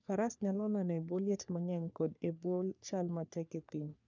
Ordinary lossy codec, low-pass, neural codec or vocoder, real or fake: none; 7.2 kHz; codec, 44.1 kHz, 3.4 kbps, Pupu-Codec; fake